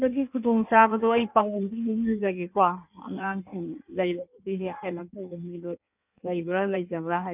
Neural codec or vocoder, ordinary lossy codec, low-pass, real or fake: codec, 16 kHz in and 24 kHz out, 1.1 kbps, FireRedTTS-2 codec; none; 3.6 kHz; fake